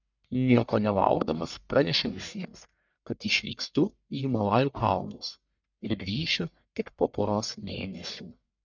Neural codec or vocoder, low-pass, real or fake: codec, 44.1 kHz, 1.7 kbps, Pupu-Codec; 7.2 kHz; fake